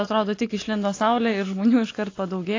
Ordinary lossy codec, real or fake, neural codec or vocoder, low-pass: AAC, 32 kbps; real; none; 7.2 kHz